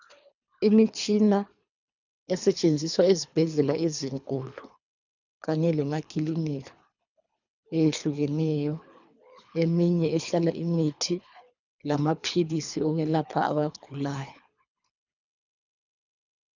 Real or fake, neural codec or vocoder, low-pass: fake; codec, 24 kHz, 3 kbps, HILCodec; 7.2 kHz